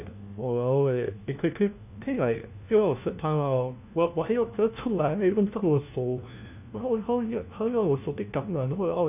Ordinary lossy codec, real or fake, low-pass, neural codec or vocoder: none; fake; 3.6 kHz; codec, 16 kHz, 1 kbps, FunCodec, trained on LibriTTS, 50 frames a second